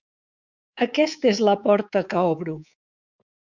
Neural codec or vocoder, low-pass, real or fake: codec, 24 kHz, 3.1 kbps, DualCodec; 7.2 kHz; fake